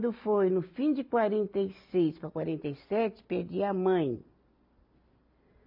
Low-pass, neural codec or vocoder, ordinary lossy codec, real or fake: 5.4 kHz; none; AAC, 48 kbps; real